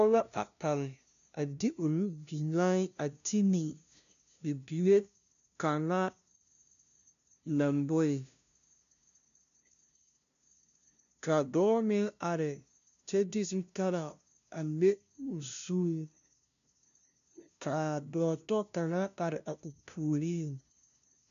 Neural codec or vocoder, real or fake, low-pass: codec, 16 kHz, 0.5 kbps, FunCodec, trained on LibriTTS, 25 frames a second; fake; 7.2 kHz